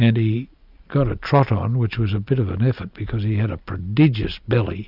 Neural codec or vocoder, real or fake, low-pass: none; real; 5.4 kHz